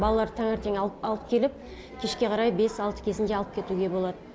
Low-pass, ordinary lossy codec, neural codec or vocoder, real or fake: none; none; none; real